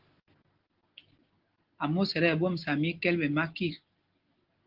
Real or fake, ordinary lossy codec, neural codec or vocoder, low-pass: real; Opus, 16 kbps; none; 5.4 kHz